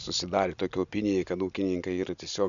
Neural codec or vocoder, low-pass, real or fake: none; 7.2 kHz; real